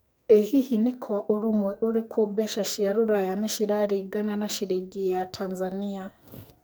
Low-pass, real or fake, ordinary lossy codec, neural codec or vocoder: none; fake; none; codec, 44.1 kHz, 2.6 kbps, SNAC